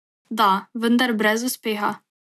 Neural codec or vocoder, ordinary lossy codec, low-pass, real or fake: none; none; 14.4 kHz; real